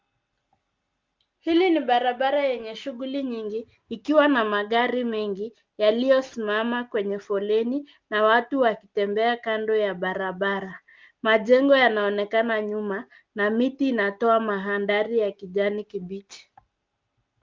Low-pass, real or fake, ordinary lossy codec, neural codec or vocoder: 7.2 kHz; real; Opus, 32 kbps; none